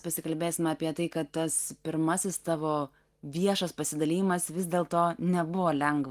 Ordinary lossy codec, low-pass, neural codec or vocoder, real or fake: Opus, 24 kbps; 14.4 kHz; none; real